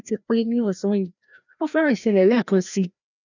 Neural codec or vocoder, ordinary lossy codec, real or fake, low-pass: codec, 16 kHz, 1 kbps, FreqCodec, larger model; none; fake; 7.2 kHz